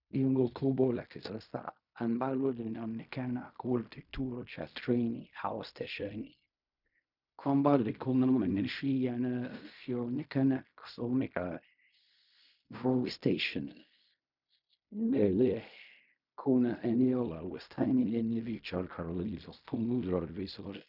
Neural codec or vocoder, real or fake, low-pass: codec, 16 kHz in and 24 kHz out, 0.4 kbps, LongCat-Audio-Codec, fine tuned four codebook decoder; fake; 5.4 kHz